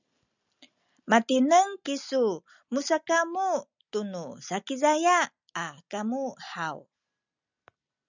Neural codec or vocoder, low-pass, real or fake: none; 7.2 kHz; real